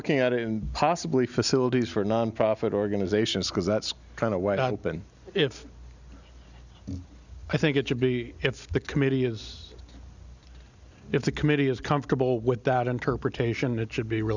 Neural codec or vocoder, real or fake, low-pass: none; real; 7.2 kHz